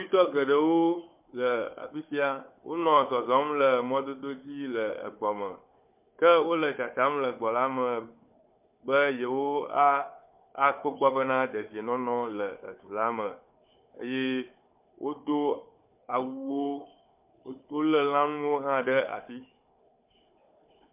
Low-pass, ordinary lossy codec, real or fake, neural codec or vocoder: 3.6 kHz; MP3, 32 kbps; fake; codec, 16 kHz, 4 kbps, FunCodec, trained on Chinese and English, 50 frames a second